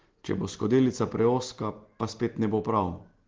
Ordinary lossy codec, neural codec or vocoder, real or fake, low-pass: Opus, 16 kbps; none; real; 7.2 kHz